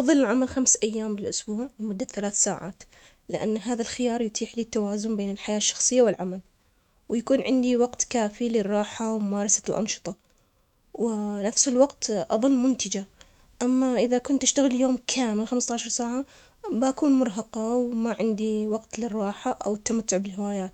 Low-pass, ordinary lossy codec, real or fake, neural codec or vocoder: 19.8 kHz; none; fake; autoencoder, 48 kHz, 128 numbers a frame, DAC-VAE, trained on Japanese speech